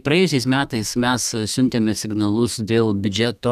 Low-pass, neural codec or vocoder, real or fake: 14.4 kHz; codec, 32 kHz, 1.9 kbps, SNAC; fake